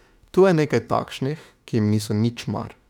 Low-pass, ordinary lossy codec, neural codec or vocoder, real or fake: 19.8 kHz; none; autoencoder, 48 kHz, 32 numbers a frame, DAC-VAE, trained on Japanese speech; fake